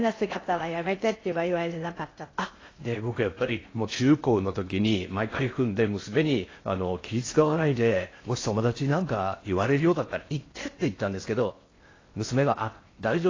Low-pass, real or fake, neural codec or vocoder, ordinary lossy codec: 7.2 kHz; fake; codec, 16 kHz in and 24 kHz out, 0.6 kbps, FocalCodec, streaming, 2048 codes; AAC, 32 kbps